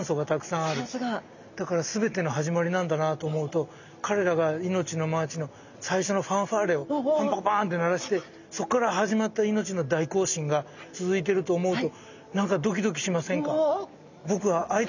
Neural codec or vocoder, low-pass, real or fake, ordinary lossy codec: none; 7.2 kHz; real; none